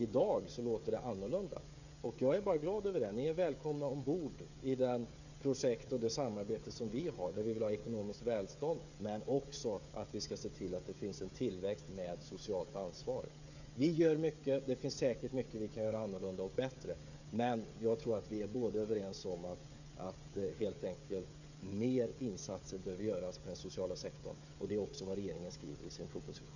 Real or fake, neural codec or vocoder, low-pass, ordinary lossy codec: fake; codec, 16 kHz, 16 kbps, FreqCodec, smaller model; 7.2 kHz; none